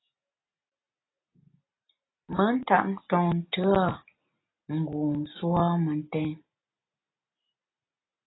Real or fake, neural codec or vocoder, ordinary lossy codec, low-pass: real; none; AAC, 16 kbps; 7.2 kHz